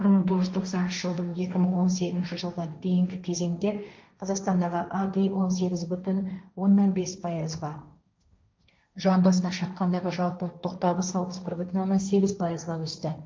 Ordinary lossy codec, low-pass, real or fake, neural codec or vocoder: none; none; fake; codec, 16 kHz, 1.1 kbps, Voila-Tokenizer